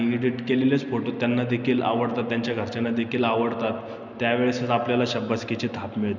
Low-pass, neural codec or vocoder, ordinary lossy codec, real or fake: 7.2 kHz; none; none; real